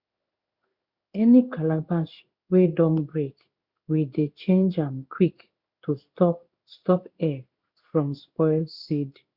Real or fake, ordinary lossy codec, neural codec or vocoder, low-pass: fake; Opus, 64 kbps; codec, 16 kHz in and 24 kHz out, 1 kbps, XY-Tokenizer; 5.4 kHz